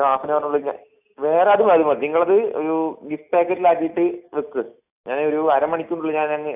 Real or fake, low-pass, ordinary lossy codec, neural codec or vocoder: real; 3.6 kHz; AAC, 32 kbps; none